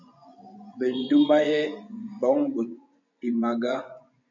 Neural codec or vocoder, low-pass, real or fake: vocoder, 24 kHz, 100 mel bands, Vocos; 7.2 kHz; fake